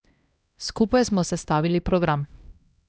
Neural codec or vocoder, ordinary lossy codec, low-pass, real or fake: codec, 16 kHz, 1 kbps, X-Codec, HuBERT features, trained on LibriSpeech; none; none; fake